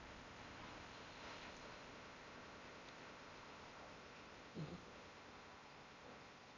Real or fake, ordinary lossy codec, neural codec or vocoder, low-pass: fake; Opus, 64 kbps; codec, 16 kHz in and 24 kHz out, 0.8 kbps, FocalCodec, streaming, 65536 codes; 7.2 kHz